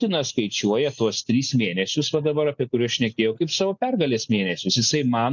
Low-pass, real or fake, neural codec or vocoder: 7.2 kHz; real; none